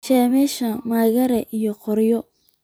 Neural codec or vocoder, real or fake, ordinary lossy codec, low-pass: vocoder, 44.1 kHz, 128 mel bands every 256 samples, BigVGAN v2; fake; none; none